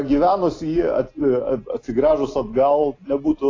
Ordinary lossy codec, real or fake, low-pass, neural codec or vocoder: AAC, 32 kbps; real; 7.2 kHz; none